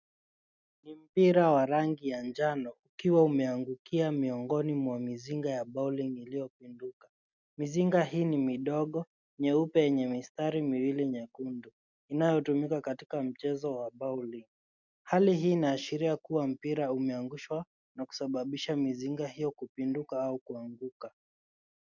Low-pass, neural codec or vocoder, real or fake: 7.2 kHz; none; real